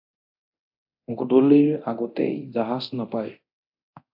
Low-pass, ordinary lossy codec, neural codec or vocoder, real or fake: 5.4 kHz; AAC, 48 kbps; codec, 24 kHz, 0.9 kbps, DualCodec; fake